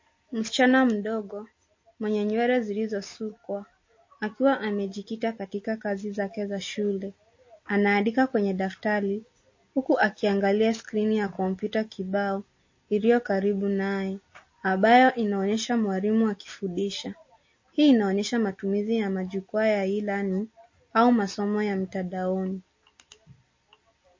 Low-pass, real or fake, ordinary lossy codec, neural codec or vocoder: 7.2 kHz; real; MP3, 32 kbps; none